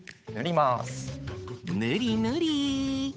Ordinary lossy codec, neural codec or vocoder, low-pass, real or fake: none; codec, 16 kHz, 8 kbps, FunCodec, trained on Chinese and English, 25 frames a second; none; fake